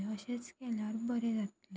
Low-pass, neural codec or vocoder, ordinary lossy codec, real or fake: none; none; none; real